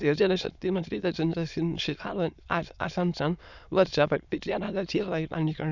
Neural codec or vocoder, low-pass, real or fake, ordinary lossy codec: autoencoder, 22.05 kHz, a latent of 192 numbers a frame, VITS, trained on many speakers; 7.2 kHz; fake; none